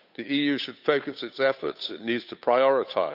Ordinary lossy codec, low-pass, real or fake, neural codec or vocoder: none; 5.4 kHz; fake; codec, 16 kHz, 8 kbps, FunCodec, trained on Chinese and English, 25 frames a second